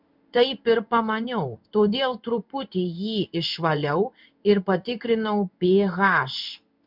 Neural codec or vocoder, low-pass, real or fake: codec, 16 kHz in and 24 kHz out, 1 kbps, XY-Tokenizer; 5.4 kHz; fake